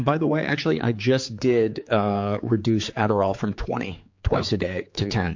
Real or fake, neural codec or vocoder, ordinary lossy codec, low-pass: fake; codec, 16 kHz, 4 kbps, X-Codec, HuBERT features, trained on general audio; MP3, 48 kbps; 7.2 kHz